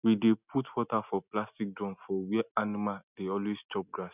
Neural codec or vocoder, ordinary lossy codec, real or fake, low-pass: none; none; real; 3.6 kHz